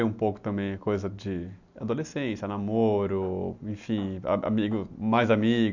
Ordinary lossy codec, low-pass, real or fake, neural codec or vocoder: none; 7.2 kHz; real; none